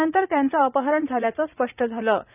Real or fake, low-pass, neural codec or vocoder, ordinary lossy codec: fake; 3.6 kHz; vocoder, 44.1 kHz, 80 mel bands, Vocos; none